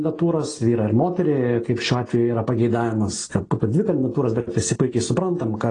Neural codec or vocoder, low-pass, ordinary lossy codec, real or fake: none; 9.9 kHz; AAC, 32 kbps; real